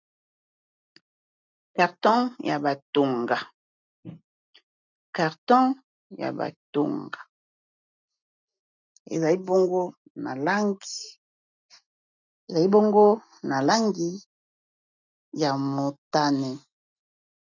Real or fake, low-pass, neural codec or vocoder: real; 7.2 kHz; none